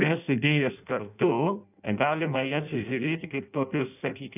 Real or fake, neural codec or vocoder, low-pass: fake; codec, 16 kHz in and 24 kHz out, 0.6 kbps, FireRedTTS-2 codec; 3.6 kHz